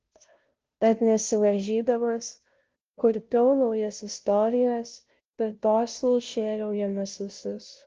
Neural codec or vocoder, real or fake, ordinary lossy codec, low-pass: codec, 16 kHz, 0.5 kbps, FunCodec, trained on Chinese and English, 25 frames a second; fake; Opus, 16 kbps; 7.2 kHz